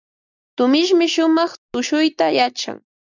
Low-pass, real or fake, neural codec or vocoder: 7.2 kHz; real; none